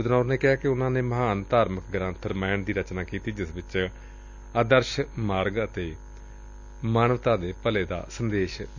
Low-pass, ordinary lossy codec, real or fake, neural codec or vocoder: 7.2 kHz; none; real; none